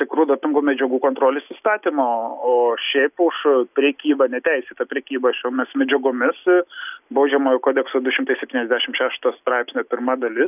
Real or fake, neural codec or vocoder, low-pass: real; none; 3.6 kHz